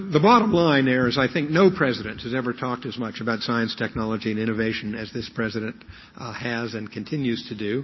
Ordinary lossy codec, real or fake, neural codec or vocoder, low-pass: MP3, 24 kbps; real; none; 7.2 kHz